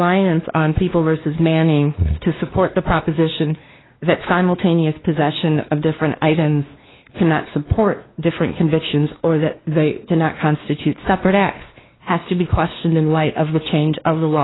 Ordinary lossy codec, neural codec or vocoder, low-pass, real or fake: AAC, 16 kbps; codec, 16 kHz, 2 kbps, X-Codec, WavLM features, trained on Multilingual LibriSpeech; 7.2 kHz; fake